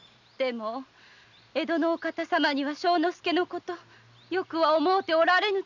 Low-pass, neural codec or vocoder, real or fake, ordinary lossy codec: 7.2 kHz; none; real; none